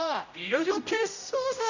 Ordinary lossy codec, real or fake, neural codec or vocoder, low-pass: none; fake; codec, 16 kHz, 0.5 kbps, X-Codec, HuBERT features, trained on general audio; 7.2 kHz